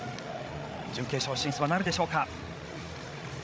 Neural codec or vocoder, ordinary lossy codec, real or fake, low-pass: codec, 16 kHz, 16 kbps, FreqCodec, larger model; none; fake; none